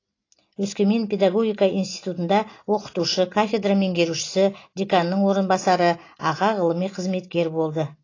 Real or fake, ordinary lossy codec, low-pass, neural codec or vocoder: real; AAC, 32 kbps; 7.2 kHz; none